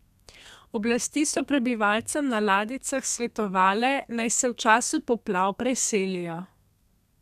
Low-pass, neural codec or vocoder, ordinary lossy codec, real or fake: 14.4 kHz; codec, 32 kHz, 1.9 kbps, SNAC; none; fake